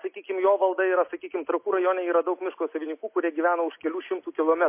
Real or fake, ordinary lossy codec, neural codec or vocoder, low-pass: real; MP3, 24 kbps; none; 3.6 kHz